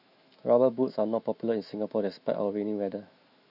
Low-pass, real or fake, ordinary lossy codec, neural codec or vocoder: 5.4 kHz; fake; none; autoencoder, 48 kHz, 128 numbers a frame, DAC-VAE, trained on Japanese speech